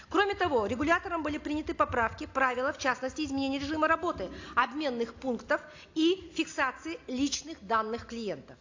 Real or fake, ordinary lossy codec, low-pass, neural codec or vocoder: real; AAC, 48 kbps; 7.2 kHz; none